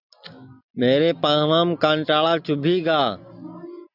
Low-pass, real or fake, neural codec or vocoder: 5.4 kHz; real; none